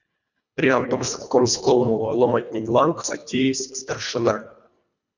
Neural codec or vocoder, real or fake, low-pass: codec, 24 kHz, 1.5 kbps, HILCodec; fake; 7.2 kHz